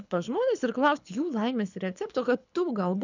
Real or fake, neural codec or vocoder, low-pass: fake; codec, 24 kHz, 6 kbps, HILCodec; 7.2 kHz